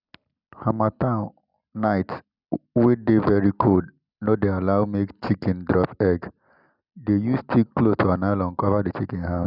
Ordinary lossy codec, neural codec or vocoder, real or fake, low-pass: none; none; real; 5.4 kHz